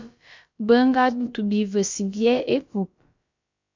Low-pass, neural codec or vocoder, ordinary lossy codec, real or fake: 7.2 kHz; codec, 16 kHz, about 1 kbps, DyCAST, with the encoder's durations; MP3, 64 kbps; fake